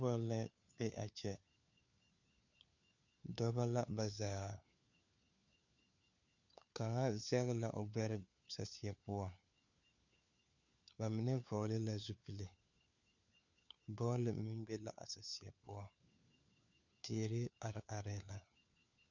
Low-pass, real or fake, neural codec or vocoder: 7.2 kHz; fake; codec, 16 kHz, 4 kbps, FunCodec, trained on LibriTTS, 50 frames a second